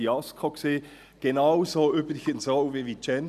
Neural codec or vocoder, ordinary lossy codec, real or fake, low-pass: none; none; real; 14.4 kHz